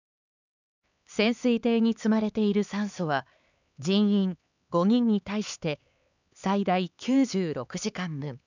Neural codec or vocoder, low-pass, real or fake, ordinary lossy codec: codec, 16 kHz, 4 kbps, X-Codec, HuBERT features, trained on LibriSpeech; 7.2 kHz; fake; none